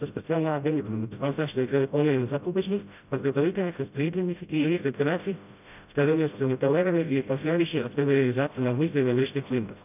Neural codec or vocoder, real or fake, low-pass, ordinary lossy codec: codec, 16 kHz, 0.5 kbps, FreqCodec, smaller model; fake; 3.6 kHz; none